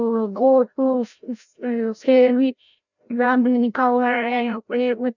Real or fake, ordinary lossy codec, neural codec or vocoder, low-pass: fake; none; codec, 16 kHz, 0.5 kbps, FreqCodec, larger model; 7.2 kHz